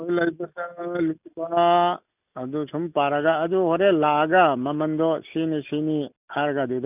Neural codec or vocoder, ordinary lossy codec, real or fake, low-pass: none; none; real; 3.6 kHz